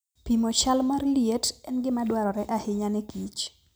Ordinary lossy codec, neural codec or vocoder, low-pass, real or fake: none; none; none; real